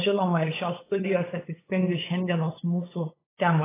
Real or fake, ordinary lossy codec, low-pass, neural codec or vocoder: fake; AAC, 16 kbps; 3.6 kHz; codec, 16 kHz, 8 kbps, FunCodec, trained on LibriTTS, 25 frames a second